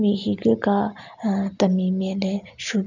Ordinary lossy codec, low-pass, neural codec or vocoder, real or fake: none; 7.2 kHz; none; real